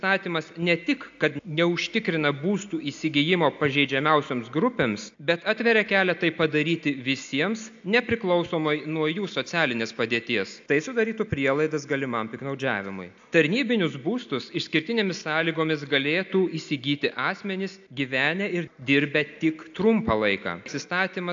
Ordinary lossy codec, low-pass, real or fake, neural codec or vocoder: AAC, 64 kbps; 7.2 kHz; real; none